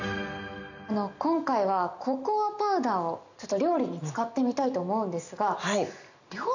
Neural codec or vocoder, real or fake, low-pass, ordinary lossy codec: none; real; 7.2 kHz; none